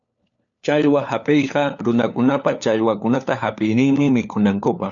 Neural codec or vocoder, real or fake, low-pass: codec, 16 kHz, 4 kbps, FunCodec, trained on LibriTTS, 50 frames a second; fake; 7.2 kHz